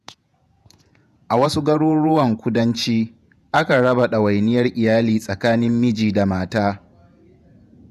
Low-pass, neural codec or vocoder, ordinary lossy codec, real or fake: 14.4 kHz; vocoder, 48 kHz, 128 mel bands, Vocos; none; fake